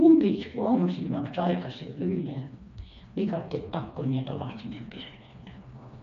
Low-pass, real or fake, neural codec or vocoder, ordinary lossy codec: 7.2 kHz; fake; codec, 16 kHz, 2 kbps, FreqCodec, smaller model; none